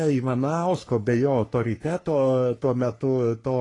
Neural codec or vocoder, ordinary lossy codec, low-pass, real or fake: codec, 44.1 kHz, 3.4 kbps, Pupu-Codec; AAC, 32 kbps; 10.8 kHz; fake